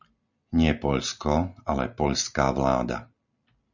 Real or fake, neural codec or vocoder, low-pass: real; none; 7.2 kHz